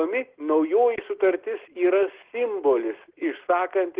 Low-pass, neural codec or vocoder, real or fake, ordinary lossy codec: 3.6 kHz; none; real; Opus, 16 kbps